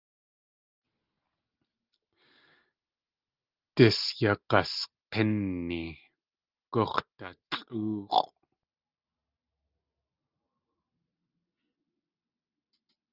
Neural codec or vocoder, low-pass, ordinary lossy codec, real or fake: none; 5.4 kHz; Opus, 32 kbps; real